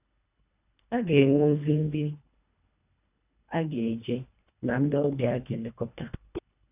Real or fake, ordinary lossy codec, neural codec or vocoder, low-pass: fake; none; codec, 24 kHz, 1.5 kbps, HILCodec; 3.6 kHz